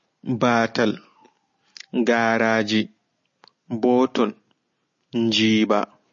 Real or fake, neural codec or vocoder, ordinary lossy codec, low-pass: real; none; MP3, 32 kbps; 7.2 kHz